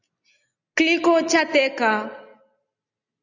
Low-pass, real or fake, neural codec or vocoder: 7.2 kHz; real; none